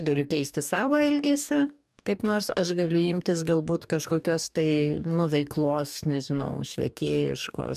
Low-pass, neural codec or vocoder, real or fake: 14.4 kHz; codec, 44.1 kHz, 2.6 kbps, DAC; fake